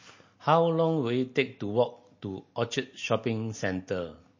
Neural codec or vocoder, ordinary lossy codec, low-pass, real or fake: none; MP3, 32 kbps; 7.2 kHz; real